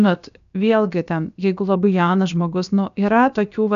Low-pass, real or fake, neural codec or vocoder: 7.2 kHz; fake; codec, 16 kHz, about 1 kbps, DyCAST, with the encoder's durations